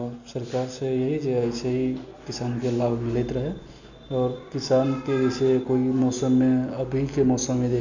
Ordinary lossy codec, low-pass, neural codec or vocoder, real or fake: none; 7.2 kHz; none; real